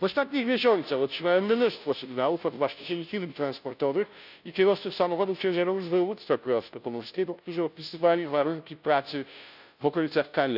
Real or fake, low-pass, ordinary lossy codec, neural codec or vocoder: fake; 5.4 kHz; none; codec, 16 kHz, 0.5 kbps, FunCodec, trained on Chinese and English, 25 frames a second